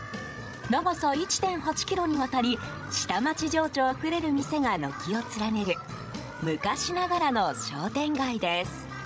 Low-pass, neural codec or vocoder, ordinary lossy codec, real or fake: none; codec, 16 kHz, 16 kbps, FreqCodec, larger model; none; fake